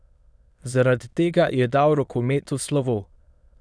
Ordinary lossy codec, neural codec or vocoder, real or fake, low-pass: none; autoencoder, 22.05 kHz, a latent of 192 numbers a frame, VITS, trained on many speakers; fake; none